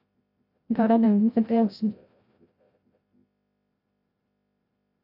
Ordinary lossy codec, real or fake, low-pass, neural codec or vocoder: AAC, 48 kbps; fake; 5.4 kHz; codec, 16 kHz, 0.5 kbps, FreqCodec, larger model